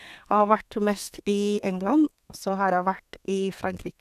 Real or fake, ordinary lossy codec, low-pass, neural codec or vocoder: fake; none; 14.4 kHz; codec, 32 kHz, 1.9 kbps, SNAC